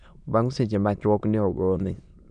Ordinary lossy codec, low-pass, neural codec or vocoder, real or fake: none; 9.9 kHz; autoencoder, 22.05 kHz, a latent of 192 numbers a frame, VITS, trained on many speakers; fake